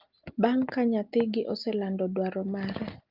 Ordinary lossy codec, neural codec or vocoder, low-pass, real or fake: Opus, 32 kbps; none; 5.4 kHz; real